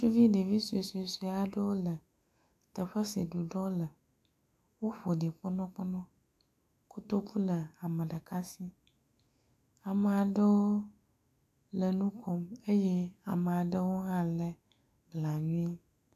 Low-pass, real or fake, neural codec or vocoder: 14.4 kHz; fake; autoencoder, 48 kHz, 128 numbers a frame, DAC-VAE, trained on Japanese speech